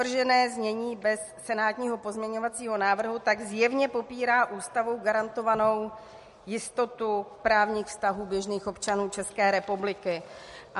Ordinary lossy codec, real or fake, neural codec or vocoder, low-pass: MP3, 48 kbps; real; none; 14.4 kHz